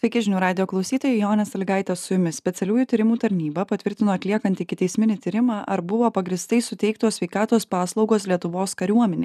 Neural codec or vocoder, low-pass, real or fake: none; 14.4 kHz; real